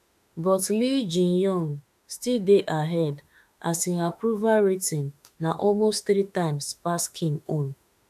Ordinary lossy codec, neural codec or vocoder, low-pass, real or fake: AAC, 96 kbps; autoencoder, 48 kHz, 32 numbers a frame, DAC-VAE, trained on Japanese speech; 14.4 kHz; fake